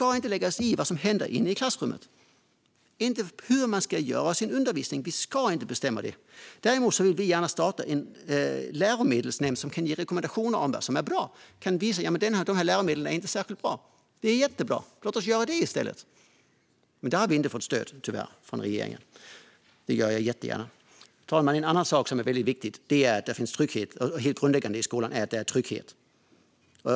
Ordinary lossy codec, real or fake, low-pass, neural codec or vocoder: none; real; none; none